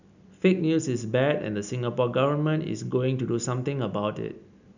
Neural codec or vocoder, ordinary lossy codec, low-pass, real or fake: none; none; 7.2 kHz; real